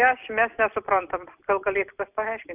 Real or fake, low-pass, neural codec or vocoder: real; 3.6 kHz; none